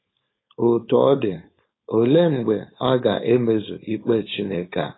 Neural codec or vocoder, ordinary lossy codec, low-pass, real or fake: codec, 16 kHz, 4.8 kbps, FACodec; AAC, 16 kbps; 7.2 kHz; fake